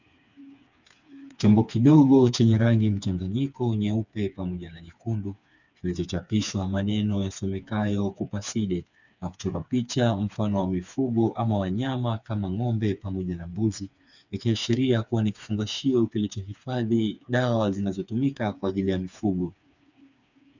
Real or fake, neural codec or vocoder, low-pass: fake; codec, 16 kHz, 4 kbps, FreqCodec, smaller model; 7.2 kHz